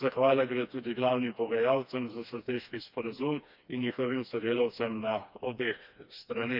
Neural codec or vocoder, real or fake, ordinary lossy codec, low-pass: codec, 16 kHz, 2 kbps, FreqCodec, smaller model; fake; none; 5.4 kHz